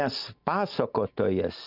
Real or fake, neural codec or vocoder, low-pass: real; none; 5.4 kHz